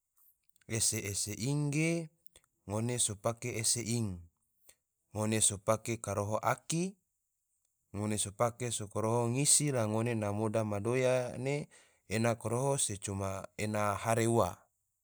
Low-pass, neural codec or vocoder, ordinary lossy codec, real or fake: none; none; none; real